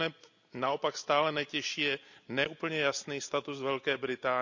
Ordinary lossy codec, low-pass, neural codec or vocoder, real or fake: none; 7.2 kHz; none; real